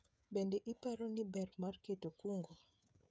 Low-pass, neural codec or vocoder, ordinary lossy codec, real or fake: none; codec, 16 kHz, 16 kbps, FreqCodec, smaller model; none; fake